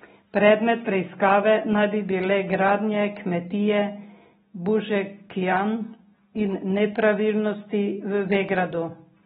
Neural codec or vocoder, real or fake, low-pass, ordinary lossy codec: none; real; 19.8 kHz; AAC, 16 kbps